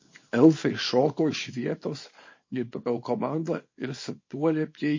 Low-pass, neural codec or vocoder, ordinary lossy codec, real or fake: 7.2 kHz; codec, 24 kHz, 0.9 kbps, WavTokenizer, small release; MP3, 32 kbps; fake